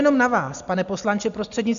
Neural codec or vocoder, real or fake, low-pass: none; real; 7.2 kHz